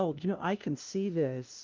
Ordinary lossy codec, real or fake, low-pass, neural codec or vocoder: Opus, 32 kbps; fake; 7.2 kHz; codec, 16 kHz, 0.5 kbps, FunCodec, trained on LibriTTS, 25 frames a second